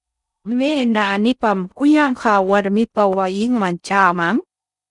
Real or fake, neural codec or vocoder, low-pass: fake; codec, 16 kHz in and 24 kHz out, 0.6 kbps, FocalCodec, streaming, 4096 codes; 10.8 kHz